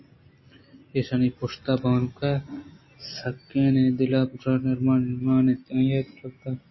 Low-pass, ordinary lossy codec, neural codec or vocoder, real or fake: 7.2 kHz; MP3, 24 kbps; none; real